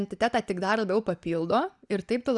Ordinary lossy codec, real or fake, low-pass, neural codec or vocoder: Opus, 64 kbps; real; 10.8 kHz; none